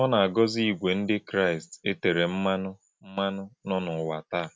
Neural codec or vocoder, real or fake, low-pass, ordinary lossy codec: none; real; none; none